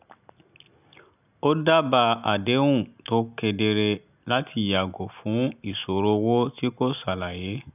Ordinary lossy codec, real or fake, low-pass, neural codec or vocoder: none; real; 3.6 kHz; none